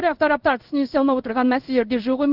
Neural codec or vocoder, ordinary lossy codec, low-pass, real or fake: codec, 16 kHz in and 24 kHz out, 1 kbps, XY-Tokenizer; Opus, 32 kbps; 5.4 kHz; fake